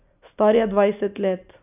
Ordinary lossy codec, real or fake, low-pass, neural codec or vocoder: none; real; 3.6 kHz; none